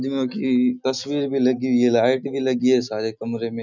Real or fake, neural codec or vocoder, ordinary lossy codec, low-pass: real; none; none; 7.2 kHz